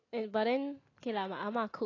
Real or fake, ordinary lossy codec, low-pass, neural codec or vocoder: real; AAC, 32 kbps; 7.2 kHz; none